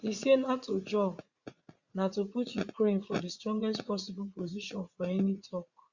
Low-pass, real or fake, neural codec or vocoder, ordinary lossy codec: 7.2 kHz; fake; vocoder, 44.1 kHz, 80 mel bands, Vocos; Opus, 64 kbps